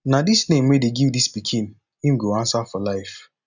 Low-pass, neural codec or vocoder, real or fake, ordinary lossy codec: 7.2 kHz; none; real; none